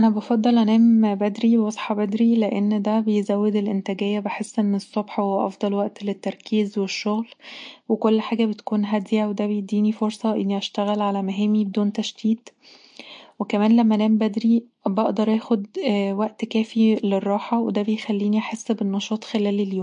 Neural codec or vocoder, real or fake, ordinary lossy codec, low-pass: none; real; MP3, 48 kbps; 9.9 kHz